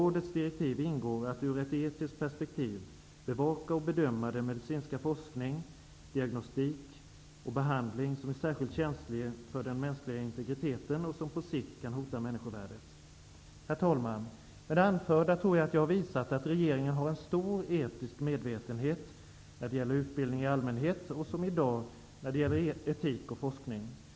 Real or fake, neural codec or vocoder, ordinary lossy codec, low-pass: real; none; none; none